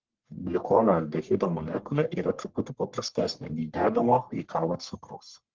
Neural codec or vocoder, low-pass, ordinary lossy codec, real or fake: codec, 44.1 kHz, 1.7 kbps, Pupu-Codec; 7.2 kHz; Opus, 16 kbps; fake